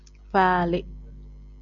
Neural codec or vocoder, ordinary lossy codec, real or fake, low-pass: none; Opus, 64 kbps; real; 7.2 kHz